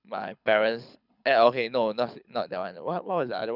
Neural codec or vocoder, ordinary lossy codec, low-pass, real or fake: codec, 24 kHz, 6 kbps, HILCodec; none; 5.4 kHz; fake